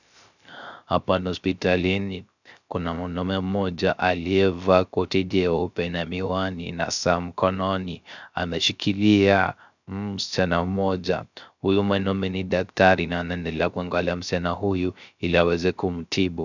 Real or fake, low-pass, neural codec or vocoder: fake; 7.2 kHz; codec, 16 kHz, 0.3 kbps, FocalCodec